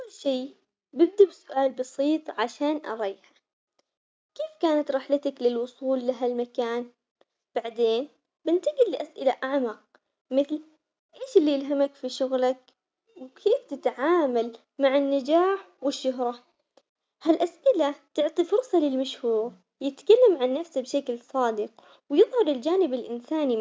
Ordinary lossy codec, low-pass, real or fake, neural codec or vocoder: none; none; real; none